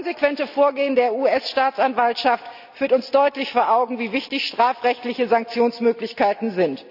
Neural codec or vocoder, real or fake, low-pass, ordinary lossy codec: none; real; 5.4 kHz; none